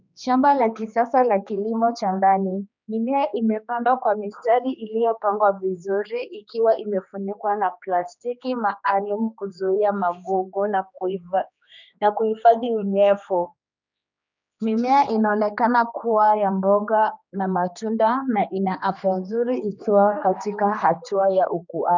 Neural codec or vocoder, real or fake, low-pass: codec, 16 kHz, 2 kbps, X-Codec, HuBERT features, trained on general audio; fake; 7.2 kHz